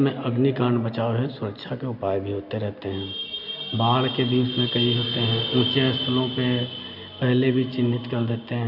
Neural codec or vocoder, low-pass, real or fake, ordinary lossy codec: none; 5.4 kHz; real; none